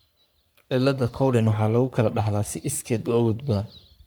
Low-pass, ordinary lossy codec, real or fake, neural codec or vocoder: none; none; fake; codec, 44.1 kHz, 3.4 kbps, Pupu-Codec